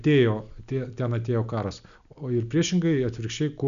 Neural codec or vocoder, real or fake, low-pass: none; real; 7.2 kHz